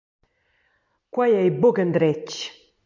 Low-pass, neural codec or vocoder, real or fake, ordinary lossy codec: 7.2 kHz; none; real; MP3, 64 kbps